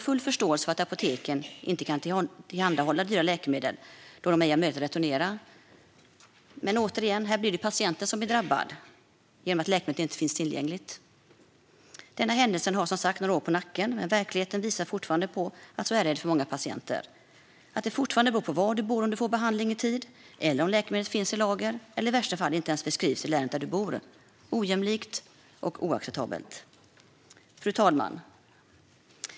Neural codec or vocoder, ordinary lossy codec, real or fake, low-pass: none; none; real; none